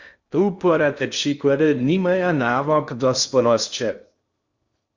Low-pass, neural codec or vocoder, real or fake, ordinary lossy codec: 7.2 kHz; codec, 16 kHz in and 24 kHz out, 0.6 kbps, FocalCodec, streaming, 2048 codes; fake; Opus, 64 kbps